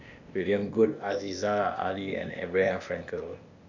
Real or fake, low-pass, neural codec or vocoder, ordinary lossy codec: fake; 7.2 kHz; codec, 16 kHz, 0.8 kbps, ZipCodec; none